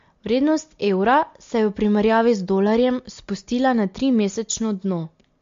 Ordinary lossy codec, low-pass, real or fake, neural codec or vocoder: MP3, 48 kbps; 7.2 kHz; real; none